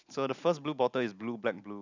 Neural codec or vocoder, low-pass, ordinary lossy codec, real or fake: none; 7.2 kHz; none; real